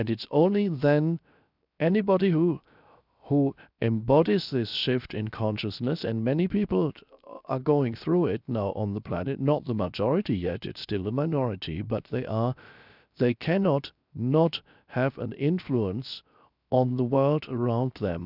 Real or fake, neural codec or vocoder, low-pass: fake; codec, 16 kHz, 0.7 kbps, FocalCodec; 5.4 kHz